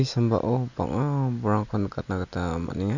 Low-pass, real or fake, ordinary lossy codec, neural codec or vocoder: 7.2 kHz; real; none; none